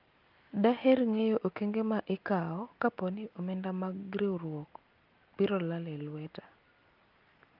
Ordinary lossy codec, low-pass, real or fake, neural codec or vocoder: Opus, 24 kbps; 5.4 kHz; real; none